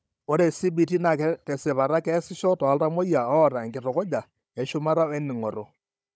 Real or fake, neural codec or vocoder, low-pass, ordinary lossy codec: fake; codec, 16 kHz, 16 kbps, FunCodec, trained on Chinese and English, 50 frames a second; none; none